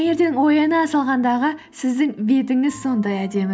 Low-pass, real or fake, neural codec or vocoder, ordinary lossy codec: none; real; none; none